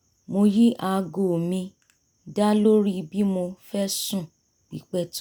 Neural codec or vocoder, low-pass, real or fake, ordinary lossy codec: none; none; real; none